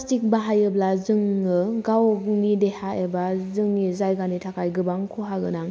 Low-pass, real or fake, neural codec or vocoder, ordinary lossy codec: none; real; none; none